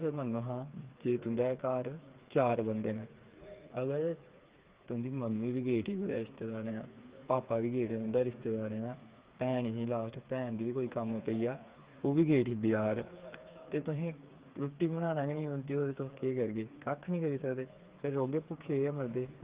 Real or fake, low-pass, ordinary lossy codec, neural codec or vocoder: fake; 3.6 kHz; Opus, 32 kbps; codec, 16 kHz, 4 kbps, FreqCodec, smaller model